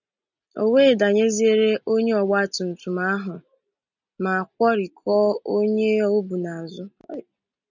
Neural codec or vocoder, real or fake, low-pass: none; real; 7.2 kHz